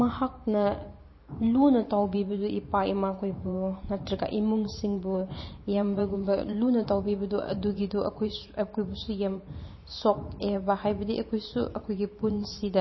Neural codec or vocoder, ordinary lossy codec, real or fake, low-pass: vocoder, 44.1 kHz, 128 mel bands every 512 samples, BigVGAN v2; MP3, 24 kbps; fake; 7.2 kHz